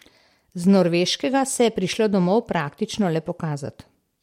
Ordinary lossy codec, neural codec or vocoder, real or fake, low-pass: MP3, 64 kbps; none; real; 19.8 kHz